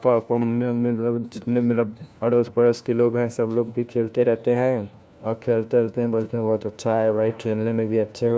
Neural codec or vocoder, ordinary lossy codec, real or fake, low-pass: codec, 16 kHz, 1 kbps, FunCodec, trained on LibriTTS, 50 frames a second; none; fake; none